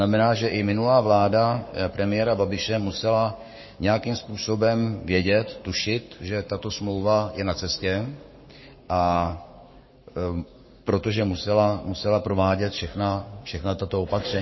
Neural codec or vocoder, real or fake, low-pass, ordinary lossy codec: codec, 44.1 kHz, 7.8 kbps, Pupu-Codec; fake; 7.2 kHz; MP3, 24 kbps